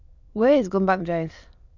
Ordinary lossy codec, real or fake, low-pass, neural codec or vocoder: none; fake; 7.2 kHz; autoencoder, 22.05 kHz, a latent of 192 numbers a frame, VITS, trained on many speakers